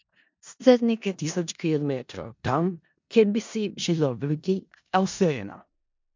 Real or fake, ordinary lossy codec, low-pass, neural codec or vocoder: fake; MP3, 64 kbps; 7.2 kHz; codec, 16 kHz in and 24 kHz out, 0.4 kbps, LongCat-Audio-Codec, four codebook decoder